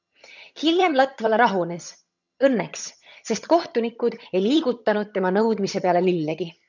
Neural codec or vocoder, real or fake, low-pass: vocoder, 22.05 kHz, 80 mel bands, HiFi-GAN; fake; 7.2 kHz